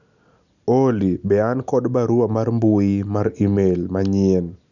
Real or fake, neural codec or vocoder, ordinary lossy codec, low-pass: real; none; none; 7.2 kHz